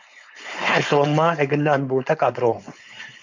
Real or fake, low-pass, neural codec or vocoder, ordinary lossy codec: fake; 7.2 kHz; codec, 16 kHz, 4.8 kbps, FACodec; MP3, 64 kbps